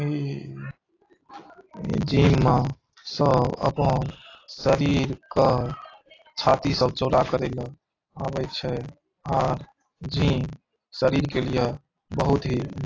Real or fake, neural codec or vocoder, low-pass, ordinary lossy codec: real; none; 7.2 kHz; AAC, 32 kbps